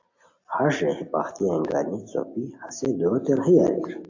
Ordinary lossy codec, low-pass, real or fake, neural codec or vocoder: AAC, 48 kbps; 7.2 kHz; real; none